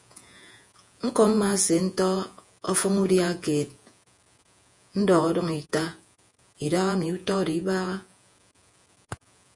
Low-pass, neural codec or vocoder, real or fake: 10.8 kHz; vocoder, 48 kHz, 128 mel bands, Vocos; fake